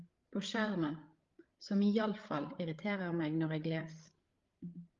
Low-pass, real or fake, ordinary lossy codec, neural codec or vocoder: 7.2 kHz; fake; Opus, 32 kbps; codec, 16 kHz, 8 kbps, FreqCodec, larger model